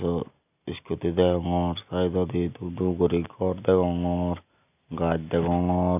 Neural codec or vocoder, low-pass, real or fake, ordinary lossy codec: none; 3.6 kHz; real; none